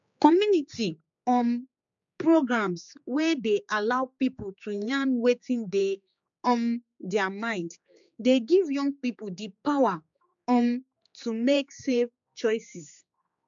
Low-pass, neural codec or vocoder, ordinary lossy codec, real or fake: 7.2 kHz; codec, 16 kHz, 4 kbps, X-Codec, HuBERT features, trained on general audio; MP3, 64 kbps; fake